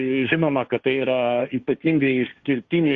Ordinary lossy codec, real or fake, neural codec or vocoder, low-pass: Opus, 64 kbps; fake; codec, 16 kHz, 1.1 kbps, Voila-Tokenizer; 7.2 kHz